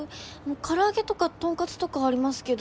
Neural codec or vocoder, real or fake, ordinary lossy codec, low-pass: none; real; none; none